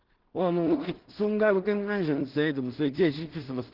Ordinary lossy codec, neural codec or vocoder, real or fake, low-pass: Opus, 16 kbps; codec, 16 kHz in and 24 kHz out, 0.4 kbps, LongCat-Audio-Codec, two codebook decoder; fake; 5.4 kHz